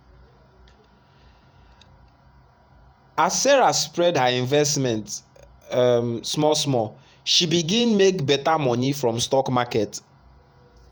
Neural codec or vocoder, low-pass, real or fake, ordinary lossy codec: vocoder, 48 kHz, 128 mel bands, Vocos; none; fake; none